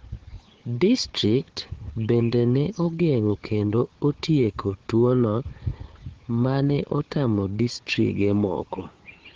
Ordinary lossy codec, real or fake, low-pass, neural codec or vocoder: Opus, 16 kbps; fake; 7.2 kHz; codec, 16 kHz, 4 kbps, FunCodec, trained on Chinese and English, 50 frames a second